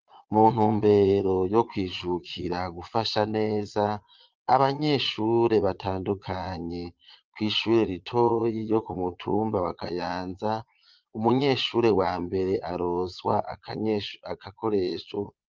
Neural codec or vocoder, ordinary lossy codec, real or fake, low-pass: vocoder, 22.05 kHz, 80 mel bands, Vocos; Opus, 32 kbps; fake; 7.2 kHz